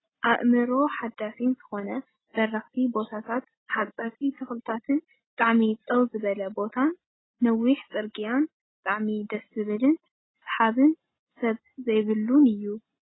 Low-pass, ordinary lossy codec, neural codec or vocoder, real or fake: 7.2 kHz; AAC, 16 kbps; none; real